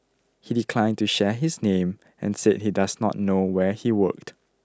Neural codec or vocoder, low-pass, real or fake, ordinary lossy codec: none; none; real; none